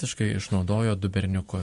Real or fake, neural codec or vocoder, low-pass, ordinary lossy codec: fake; vocoder, 44.1 kHz, 128 mel bands every 512 samples, BigVGAN v2; 14.4 kHz; MP3, 48 kbps